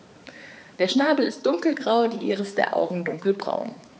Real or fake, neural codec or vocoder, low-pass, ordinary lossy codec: fake; codec, 16 kHz, 4 kbps, X-Codec, HuBERT features, trained on balanced general audio; none; none